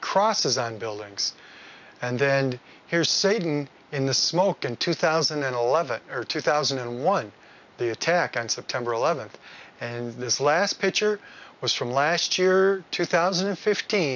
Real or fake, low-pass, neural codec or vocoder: real; 7.2 kHz; none